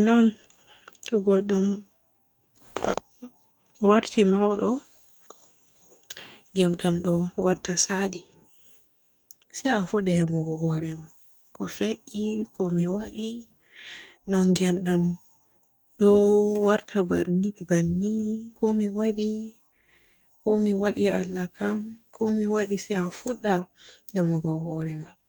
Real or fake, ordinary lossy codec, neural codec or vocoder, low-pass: fake; none; codec, 44.1 kHz, 2.6 kbps, DAC; none